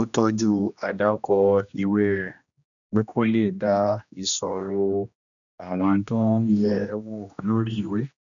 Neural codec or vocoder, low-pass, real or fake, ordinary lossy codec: codec, 16 kHz, 1 kbps, X-Codec, HuBERT features, trained on general audio; 7.2 kHz; fake; none